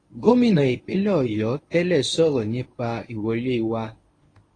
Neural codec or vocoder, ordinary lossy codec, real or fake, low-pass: codec, 24 kHz, 0.9 kbps, WavTokenizer, medium speech release version 1; AAC, 32 kbps; fake; 9.9 kHz